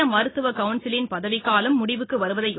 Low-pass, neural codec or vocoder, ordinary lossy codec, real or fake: 7.2 kHz; none; AAC, 16 kbps; real